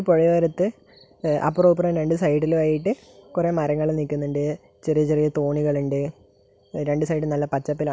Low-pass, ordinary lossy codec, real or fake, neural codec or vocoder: none; none; real; none